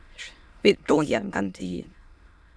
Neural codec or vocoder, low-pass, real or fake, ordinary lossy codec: autoencoder, 22.05 kHz, a latent of 192 numbers a frame, VITS, trained on many speakers; none; fake; none